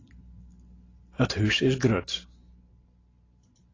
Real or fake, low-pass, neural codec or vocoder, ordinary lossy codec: real; 7.2 kHz; none; AAC, 32 kbps